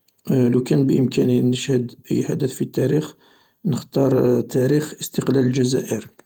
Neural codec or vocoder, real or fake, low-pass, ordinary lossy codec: vocoder, 48 kHz, 128 mel bands, Vocos; fake; 19.8 kHz; Opus, 32 kbps